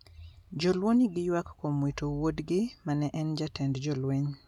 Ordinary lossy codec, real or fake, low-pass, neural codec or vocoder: none; real; 19.8 kHz; none